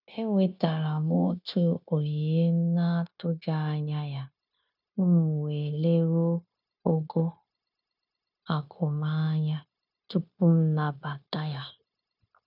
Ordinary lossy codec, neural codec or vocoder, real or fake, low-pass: none; codec, 16 kHz, 0.9 kbps, LongCat-Audio-Codec; fake; 5.4 kHz